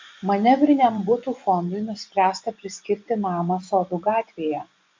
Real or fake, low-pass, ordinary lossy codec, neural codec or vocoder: fake; 7.2 kHz; MP3, 48 kbps; vocoder, 24 kHz, 100 mel bands, Vocos